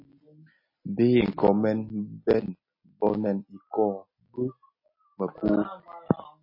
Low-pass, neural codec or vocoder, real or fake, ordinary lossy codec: 5.4 kHz; none; real; MP3, 24 kbps